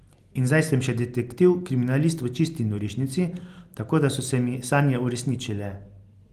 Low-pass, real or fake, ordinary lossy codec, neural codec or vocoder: 14.4 kHz; real; Opus, 24 kbps; none